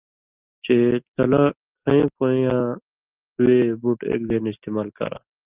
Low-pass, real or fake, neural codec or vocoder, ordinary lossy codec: 3.6 kHz; real; none; Opus, 32 kbps